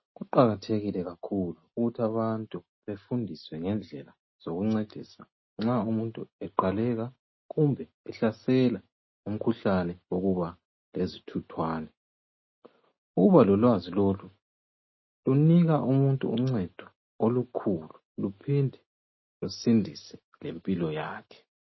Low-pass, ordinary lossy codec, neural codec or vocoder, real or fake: 7.2 kHz; MP3, 24 kbps; none; real